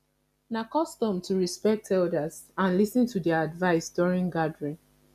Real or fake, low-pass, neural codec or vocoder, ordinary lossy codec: real; 14.4 kHz; none; none